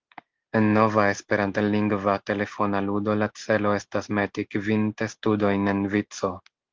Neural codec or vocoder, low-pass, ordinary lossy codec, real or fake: none; 7.2 kHz; Opus, 16 kbps; real